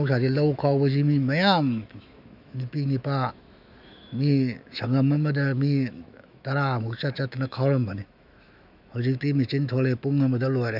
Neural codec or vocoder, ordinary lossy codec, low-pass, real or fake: autoencoder, 48 kHz, 128 numbers a frame, DAC-VAE, trained on Japanese speech; none; 5.4 kHz; fake